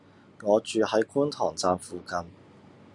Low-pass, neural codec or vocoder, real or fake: 10.8 kHz; none; real